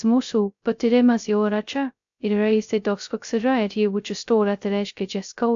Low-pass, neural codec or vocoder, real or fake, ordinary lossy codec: 7.2 kHz; codec, 16 kHz, 0.2 kbps, FocalCodec; fake; AAC, 64 kbps